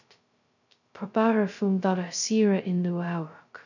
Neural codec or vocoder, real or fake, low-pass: codec, 16 kHz, 0.2 kbps, FocalCodec; fake; 7.2 kHz